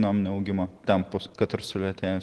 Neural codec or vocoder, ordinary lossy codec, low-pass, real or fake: none; Opus, 16 kbps; 10.8 kHz; real